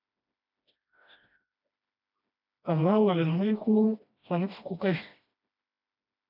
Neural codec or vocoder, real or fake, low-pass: codec, 16 kHz, 1 kbps, FreqCodec, smaller model; fake; 5.4 kHz